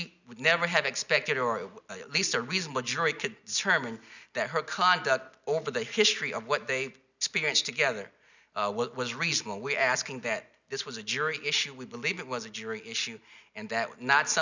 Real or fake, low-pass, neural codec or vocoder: real; 7.2 kHz; none